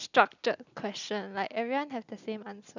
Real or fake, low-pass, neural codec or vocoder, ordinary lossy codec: real; 7.2 kHz; none; none